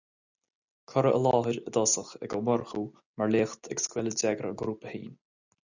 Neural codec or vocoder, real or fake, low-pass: none; real; 7.2 kHz